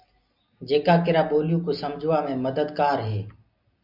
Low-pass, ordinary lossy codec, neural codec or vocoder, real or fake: 5.4 kHz; MP3, 48 kbps; none; real